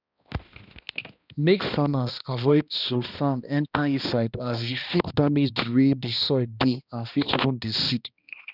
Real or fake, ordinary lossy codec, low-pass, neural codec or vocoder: fake; none; 5.4 kHz; codec, 16 kHz, 1 kbps, X-Codec, HuBERT features, trained on balanced general audio